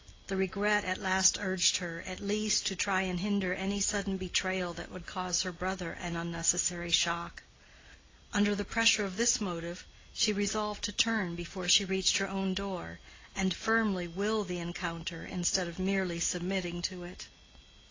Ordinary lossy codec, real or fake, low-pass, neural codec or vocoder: AAC, 32 kbps; real; 7.2 kHz; none